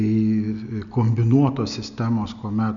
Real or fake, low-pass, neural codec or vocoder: real; 7.2 kHz; none